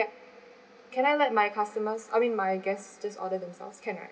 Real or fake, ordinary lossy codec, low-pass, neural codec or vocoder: real; none; none; none